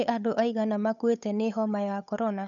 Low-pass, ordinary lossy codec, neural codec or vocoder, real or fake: 7.2 kHz; none; codec, 16 kHz, 8 kbps, FunCodec, trained on Chinese and English, 25 frames a second; fake